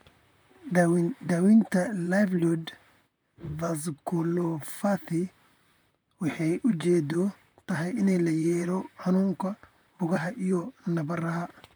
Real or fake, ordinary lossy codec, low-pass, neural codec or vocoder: fake; none; none; vocoder, 44.1 kHz, 128 mel bands, Pupu-Vocoder